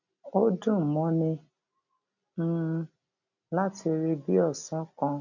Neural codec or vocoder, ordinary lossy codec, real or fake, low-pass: none; none; real; 7.2 kHz